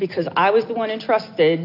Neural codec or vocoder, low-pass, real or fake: none; 5.4 kHz; real